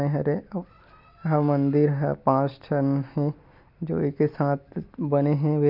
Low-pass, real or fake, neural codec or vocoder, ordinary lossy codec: 5.4 kHz; real; none; MP3, 48 kbps